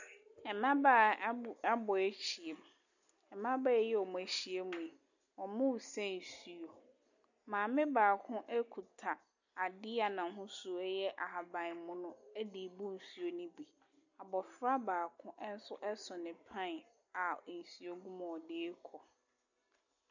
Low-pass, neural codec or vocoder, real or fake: 7.2 kHz; none; real